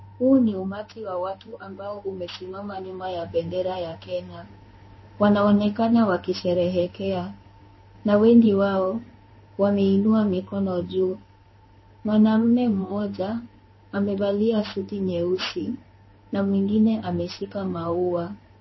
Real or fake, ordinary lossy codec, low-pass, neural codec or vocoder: fake; MP3, 24 kbps; 7.2 kHz; codec, 16 kHz in and 24 kHz out, 1 kbps, XY-Tokenizer